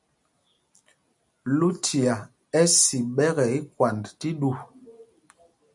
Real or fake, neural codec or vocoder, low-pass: real; none; 10.8 kHz